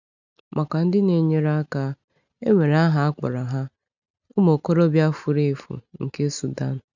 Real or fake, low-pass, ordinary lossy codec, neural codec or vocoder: real; 7.2 kHz; none; none